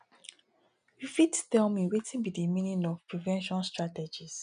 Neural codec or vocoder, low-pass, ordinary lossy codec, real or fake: none; 9.9 kHz; none; real